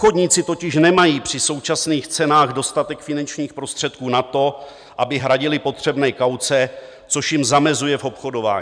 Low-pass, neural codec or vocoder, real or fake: 9.9 kHz; none; real